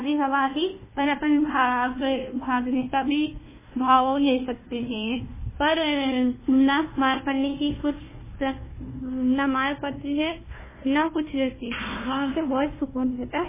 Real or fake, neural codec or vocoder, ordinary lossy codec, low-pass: fake; codec, 16 kHz, 1 kbps, FunCodec, trained on Chinese and English, 50 frames a second; MP3, 16 kbps; 3.6 kHz